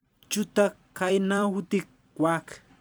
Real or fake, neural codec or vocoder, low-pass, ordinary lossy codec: fake; vocoder, 44.1 kHz, 128 mel bands every 256 samples, BigVGAN v2; none; none